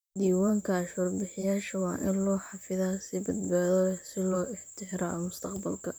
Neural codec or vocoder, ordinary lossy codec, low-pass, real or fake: vocoder, 44.1 kHz, 128 mel bands, Pupu-Vocoder; none; none; fake